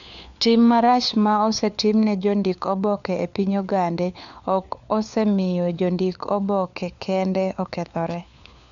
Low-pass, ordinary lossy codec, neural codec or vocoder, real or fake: 7.2 kHz; none; codec, 16 kHz, 4 kbps, FunCodec, trained on LibriTTS, 50 frames a second; fake